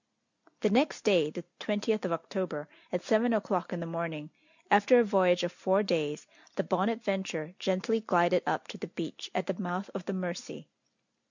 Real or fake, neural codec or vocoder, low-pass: real; none; 7.2 kHz